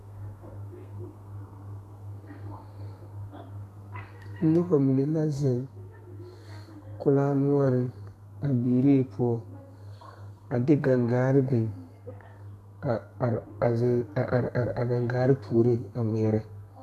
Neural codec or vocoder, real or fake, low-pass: codec, 32 kHz, 1.9 kbps, SNAC; fake; 14.4 kHz